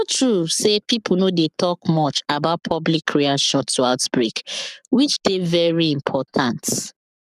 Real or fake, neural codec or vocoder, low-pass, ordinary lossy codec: fake; codec, 44.1 kHz, 7.8 kbps, Pupu-Codec; 14.4 kHz; none